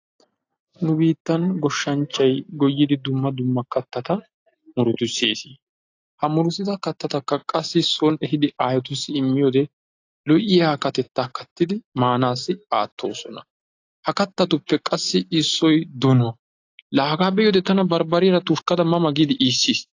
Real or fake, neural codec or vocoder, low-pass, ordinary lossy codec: real; none; 7.2 kHz; AAC, 48 kbps